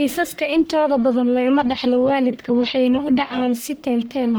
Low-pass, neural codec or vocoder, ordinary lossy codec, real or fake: none; codec, 44.1 kHz, 1.7 kbps, Pupu-Codec; none; fake